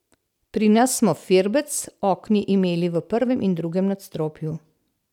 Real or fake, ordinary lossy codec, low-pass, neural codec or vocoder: real; none; 19.8 kHz; none